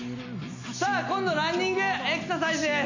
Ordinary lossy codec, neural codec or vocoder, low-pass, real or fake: none; none; 7.2 kHz; real